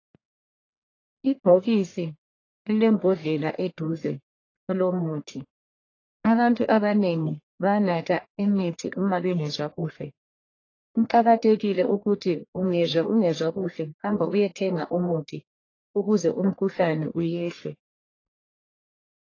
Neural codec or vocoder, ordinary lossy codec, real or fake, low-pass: codec, 44.1 kHz, 1.7 kbps, Pupu-Codec; AAC, 32 kbps; fake; 7.2 kHz